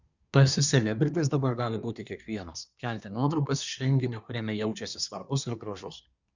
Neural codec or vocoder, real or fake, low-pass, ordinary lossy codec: codec, 24 kHz, 1 kbps, SNAC; fake; 7.2 kHz; Opus, 64 kbps